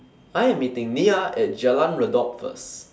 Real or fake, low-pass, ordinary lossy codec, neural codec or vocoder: real; none; none; none